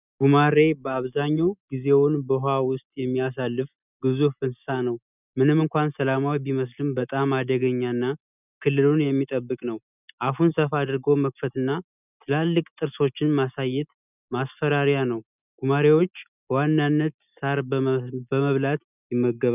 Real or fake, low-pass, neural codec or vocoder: real; 3.6 kHz; none